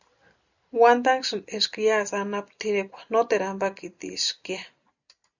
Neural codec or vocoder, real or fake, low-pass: none; real; 7.2 kHz